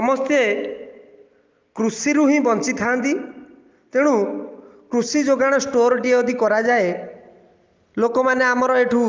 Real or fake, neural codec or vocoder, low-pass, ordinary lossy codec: real; none; 7.2 kHz; Opus, 32 kbps